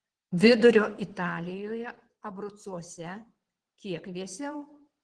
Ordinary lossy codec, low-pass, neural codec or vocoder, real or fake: Opus, 16 kbps; 9.9 kHz; vocoder, 22.05 kHz, 80 mel bands, WaveNeXt; fake